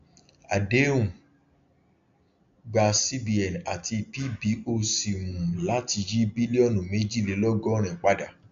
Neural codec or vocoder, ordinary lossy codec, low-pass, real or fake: none; AAC, 64 kbps; 7.2 kHz; real